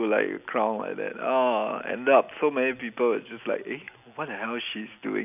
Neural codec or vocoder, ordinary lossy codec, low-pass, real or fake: none; none; 3.6 kHz; real